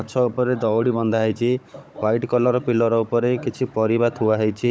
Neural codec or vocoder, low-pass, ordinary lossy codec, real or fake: codec, 16 kHz, 16 kbps, FunCodec, trained on Chinese and English, 50 frames a second; none; none; fake